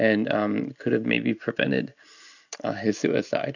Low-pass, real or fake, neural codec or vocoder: 7.2 kHz; real; none